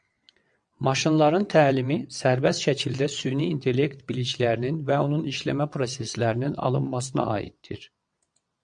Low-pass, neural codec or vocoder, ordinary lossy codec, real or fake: 9.9 kHz; vocoder, 22.05 kHz, 80 mel bands, WaveNeXt; MP3, 64 kbps; fake